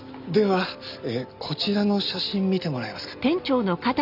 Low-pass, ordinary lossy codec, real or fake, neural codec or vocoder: 5.4 kHz; none; real; none